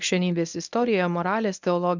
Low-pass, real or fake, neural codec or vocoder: 7.2 kHz; fake; codec, 24 kHz, 0.9 kbps, WavTokenizer, medium speech release version 1